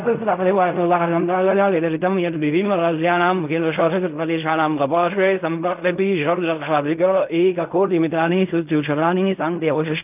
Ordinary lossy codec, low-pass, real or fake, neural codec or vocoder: none; 3.6 kHz; fake; codec, 16 kHz in and 24 kHz out, 0.4 kbps, LongCat-Audio-Codec, fine tuned four codebook decoder